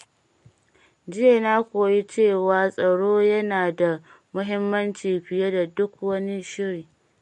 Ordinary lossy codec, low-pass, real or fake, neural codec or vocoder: MP3, 48 kbps; 14.4 kHz; real; none